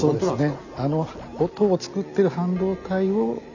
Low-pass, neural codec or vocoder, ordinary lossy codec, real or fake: 7.2 kHz; none; none; real